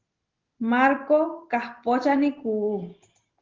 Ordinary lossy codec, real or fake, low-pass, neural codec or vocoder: Opus, 16 kbps; real; 7.2 kHz; none